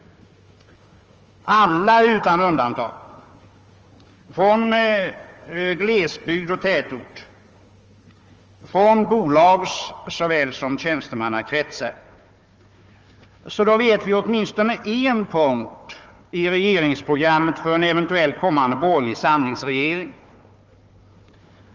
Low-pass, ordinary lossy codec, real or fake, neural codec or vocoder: 7.2 kHz; Opus, 24 kbps; fake; codec, 16 kHz, 4 kbps, FunCodec, trained on Chinese and English, 50 frames a second